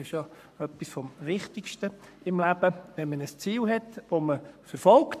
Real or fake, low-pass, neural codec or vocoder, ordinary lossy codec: fake; 14.4 kHz; codec, 44.1 kHz, 7.8 kbps, Pupu-Codec; AAC, 96 kbps